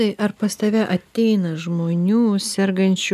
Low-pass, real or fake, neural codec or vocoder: 14.4 kHz; real; none